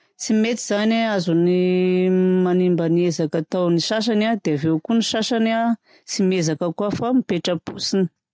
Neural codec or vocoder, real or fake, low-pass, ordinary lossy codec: none; real; none; none